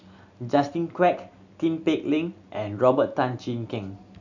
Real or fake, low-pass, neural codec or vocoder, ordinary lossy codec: real; 7.2 kHz; none; none